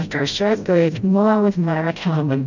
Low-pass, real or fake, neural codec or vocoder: 7.2 kHz; fake; codec, 16 kHz, 0.5 kbps, FreqCodec, smaller model